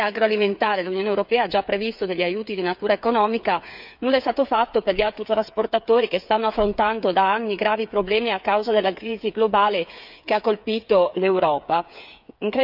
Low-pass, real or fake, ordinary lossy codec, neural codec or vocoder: 5.4 kHz; fake; none; codec, 16 kHz, 8 kbps, FreqCodec, smaller model